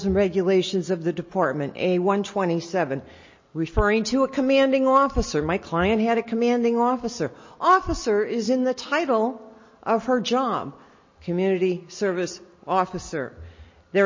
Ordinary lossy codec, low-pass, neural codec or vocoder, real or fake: MP3, 32 kbps; 7.2 kHz; none; real